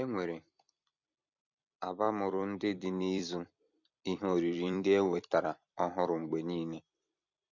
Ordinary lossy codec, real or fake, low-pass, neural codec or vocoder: none; fake; 7.2 kHz; vocoder, 44.1 kHz, 128 mel bands every 256 samples, BigVGAN v2